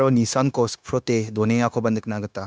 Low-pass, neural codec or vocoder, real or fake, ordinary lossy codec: none; codec, 16 kHz, 0.9 kbps, LongCat-Audio-Codec; fake; none